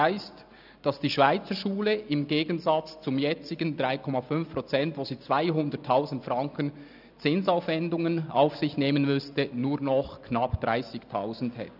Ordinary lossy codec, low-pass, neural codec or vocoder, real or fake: none; 5.4 kHz; none; real